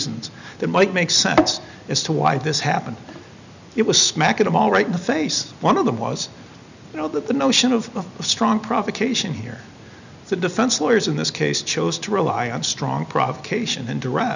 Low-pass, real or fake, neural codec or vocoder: 7.2 kHz; real; none